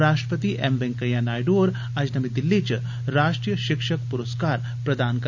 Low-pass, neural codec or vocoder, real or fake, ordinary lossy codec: 7.2 kHz; none; real; none